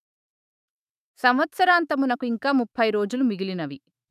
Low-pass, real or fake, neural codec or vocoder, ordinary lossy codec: 14.4 kHz; fake; autoencoder, 48 kHz, 128 numbers a frame, DAC-VAE, trained on Japanese speech; none